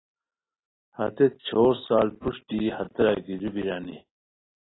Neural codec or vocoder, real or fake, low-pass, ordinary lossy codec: none; real; 7.2 kHz; AAC, 16 kbps